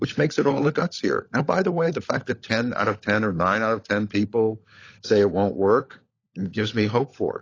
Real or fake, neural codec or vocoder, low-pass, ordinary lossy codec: fake; codec, 16 kHz, 16 kbps, FunCodec, trained on LibriTTS, 50 frames a second; 7.2 kHz; AAC, 32 kbps